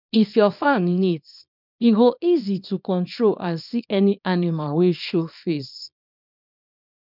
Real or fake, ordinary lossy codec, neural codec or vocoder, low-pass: fake; none; codec, 24 kHz, 0.9 kbps, WavTokenizer, small release; 5.4 kHz